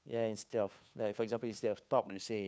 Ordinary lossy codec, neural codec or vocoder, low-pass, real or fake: none; codec, 16 kHz, 2 kbps, FunCodec, trained on Chinese and English, 25 frames a second; none; fake